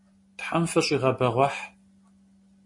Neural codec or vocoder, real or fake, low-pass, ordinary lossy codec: none; real; 10.8 kHz; MP3, 48 kbps